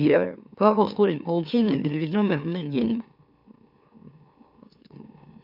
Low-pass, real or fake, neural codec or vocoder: 5.4 kHz; fake; autoencoder, 44.1 kHz, a latent of 192 numbers a frame, MeloTTS